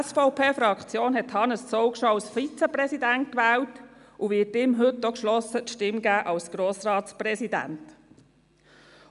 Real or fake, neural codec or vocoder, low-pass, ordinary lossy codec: real; none; 10.8 kHz; none